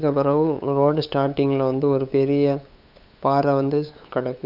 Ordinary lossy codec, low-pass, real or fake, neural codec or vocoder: none; 5.4 kHz; fake; codec, 16 kHz, 8 kbps, FunCodec, trained on LibriTTS, 25 frames a second